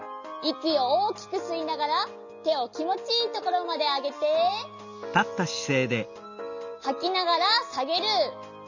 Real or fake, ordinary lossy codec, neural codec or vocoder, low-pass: real; none; none; 7.2 kHz